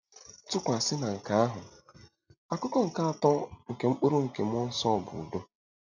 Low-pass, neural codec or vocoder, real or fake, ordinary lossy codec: 7.2 kHz; none; real; none